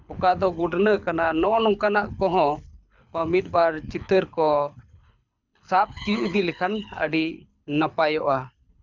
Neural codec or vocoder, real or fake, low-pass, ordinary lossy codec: codec, 24 kHz, 6 kbps, HILCodec; fake; 7.2 kHz; none